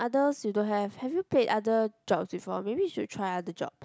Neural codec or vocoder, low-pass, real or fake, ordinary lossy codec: none; none; real; none